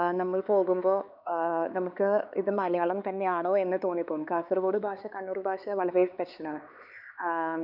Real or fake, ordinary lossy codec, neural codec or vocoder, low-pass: fake; none; codec, 16 kHz, 4 kbps, X-Codec, HuBERT features, trained on LibriSpeech; 5.4 kHz